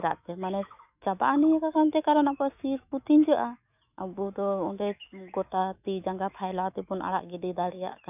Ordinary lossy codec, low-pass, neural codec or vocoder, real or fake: none; 3.6 kHz; autoencoder, 48 kHz, 128 numbers a frame, DAC-VAE, trained on Japanese speech; fake